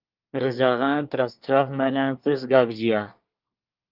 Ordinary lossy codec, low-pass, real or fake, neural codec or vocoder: Opus, 32 kbps; 5.4 kHz; fake; codec, 24 kHz, 1 kbps, SNAC